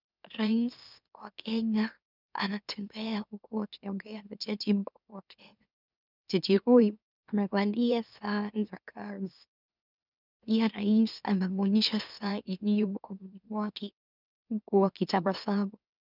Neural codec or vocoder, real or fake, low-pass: autoencoder, 44.1 kHz, a latent of 192 numbers a frame, MeloTTS; fake; 5.4 kHz